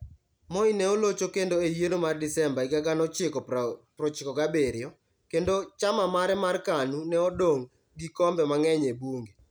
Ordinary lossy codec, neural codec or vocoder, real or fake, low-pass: none; none; real; none